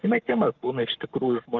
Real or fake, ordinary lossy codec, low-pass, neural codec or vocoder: fake; Opus, 16 kbps; 7.2 kHz; codec, 44.1 kHz, 7.8 kbps, Pupu-Codec